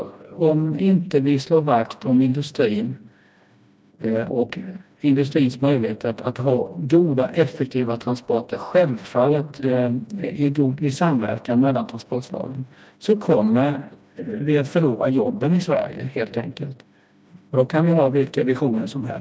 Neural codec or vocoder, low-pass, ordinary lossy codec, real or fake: codec, 16 kHz, 1 kbps, FreqCodec, smaller model; none; none; fake